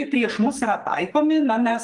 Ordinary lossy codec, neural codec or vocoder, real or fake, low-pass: Opus, 24 kbps; codec, 44.1 kHz, 2.6 kbps, SNAC; fake; 10.8 kHz